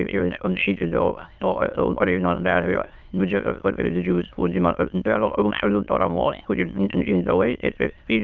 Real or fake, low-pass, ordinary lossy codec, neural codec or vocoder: fake; 7.2 kHz; Opus, 24 kbps; autoencoder, 22.05 kHz, a latent of 192 numbers a frame, VITS, trained on many speakers